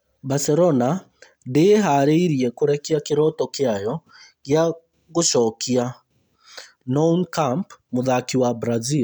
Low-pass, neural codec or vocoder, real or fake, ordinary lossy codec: none; none; real; none